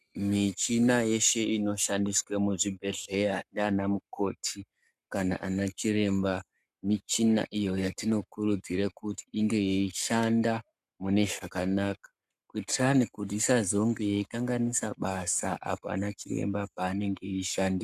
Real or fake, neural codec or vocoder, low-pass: fake; codec, 44.1 kHz, 7.8 kbps, Pupu-Codec; 14.4 kHz